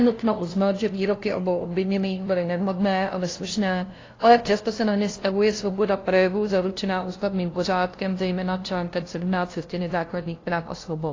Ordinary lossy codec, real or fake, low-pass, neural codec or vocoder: AAC, 32 kbps; fake; 7.2 kHz; codec, 16 kHz, 0.5 kbps, FunCodec, trained on LibriTTS, 25 frames a second